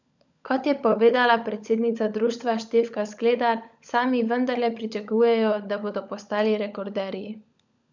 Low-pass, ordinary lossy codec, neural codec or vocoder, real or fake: 7.2 kHz; none; codec, 16 kHz, 16 kbps, FunCodec, trained on LibriTTS, 50 frames a second; fake